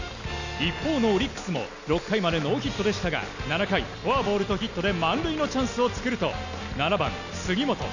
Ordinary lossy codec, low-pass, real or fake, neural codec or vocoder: none; 7.2 kHz; real; none